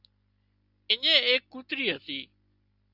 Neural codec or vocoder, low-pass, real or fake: none; 5.4 kHz; real